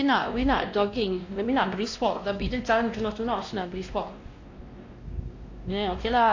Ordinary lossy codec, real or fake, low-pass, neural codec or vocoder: AAC, 48 kbps; fake; 7.2 kHz; codec, 16 kHz, 1 kbps, X-Codec, WavLM features, trained on Multilingual LibriSpeech